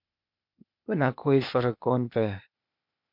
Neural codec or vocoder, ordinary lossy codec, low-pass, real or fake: codec, 16 kHz, 0.8 kbps, ZipCodec; MP3, 48 kbps; 5.4 kHz; fake